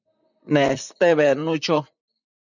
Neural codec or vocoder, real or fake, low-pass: vocoder, 44.1 kHz, 128 mel bands, Pupu-Vocoder; fake; 7.2 kHz